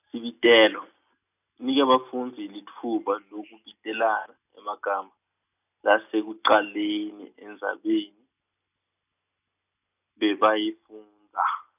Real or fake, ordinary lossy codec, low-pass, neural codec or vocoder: real; none; 3.6 kHz; none